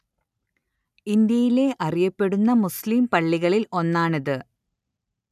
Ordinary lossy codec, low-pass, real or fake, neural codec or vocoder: none; 14.4 kHz; real; none